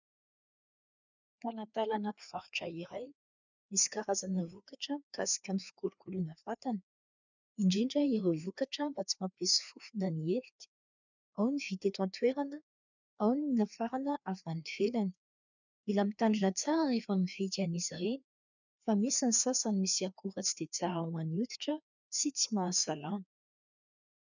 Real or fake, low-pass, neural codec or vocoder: fake; 7.2 kHz; codec, 16 kHz, 2 kbps, FreqCodec, larger model